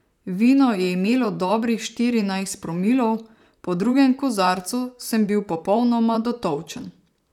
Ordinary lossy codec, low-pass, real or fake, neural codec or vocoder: none; 19.8 kHz; fake; vocoder, 44.1 kHz, 128 mel bands, Pupu-Vocoder